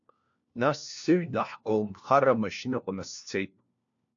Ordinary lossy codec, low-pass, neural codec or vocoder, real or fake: AAC, 64 kbps; 7.2 kHz; codec, 16 kHz, 1 kbps, FunCodec, trained on LibriTTS, 50 frames a second; fake